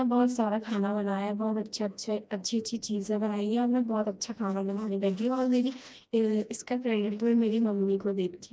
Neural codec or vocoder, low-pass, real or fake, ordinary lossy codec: codec, 16 kHz, 1 kbps, FreqCodec, smaller model; none; fake; none